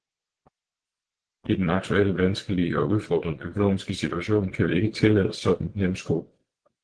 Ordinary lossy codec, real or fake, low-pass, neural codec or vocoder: Opus, 16 kbps; fake; 9.9 kHz; vocoder, 22.05 kHz, 80 mel bands, WaveNeXt